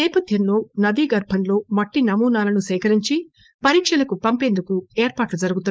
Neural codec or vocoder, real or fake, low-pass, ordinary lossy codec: codec, 16 kHz, 4.8 kbps, FACodec; fake; none; none